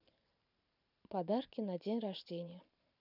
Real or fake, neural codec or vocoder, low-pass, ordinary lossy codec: fake; vocoder, 44.1 kHz, 128 mel bands every 512 samples, BigVGAN v2; 5.4 kHz; none